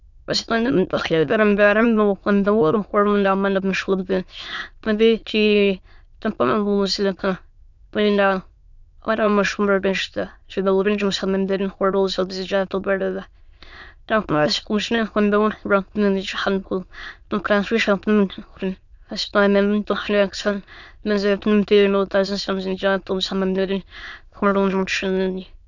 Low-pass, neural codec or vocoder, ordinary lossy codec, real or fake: 7.2 kHz; autoencoder, 22.05 kHz, a latent of 192 numbers a frame, VITS, trained on many speakers; none; fake